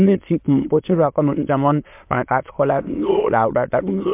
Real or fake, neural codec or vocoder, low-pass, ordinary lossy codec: fake; autoencoder, 22.05 kHz, a latent of 192 numbers a frame, VITS, trained on many speakers; 3.6 kHz; MP3, 32 kbps